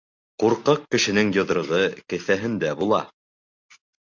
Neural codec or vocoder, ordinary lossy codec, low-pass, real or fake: none; MP3, 64 kbps; 7.2 kHz; real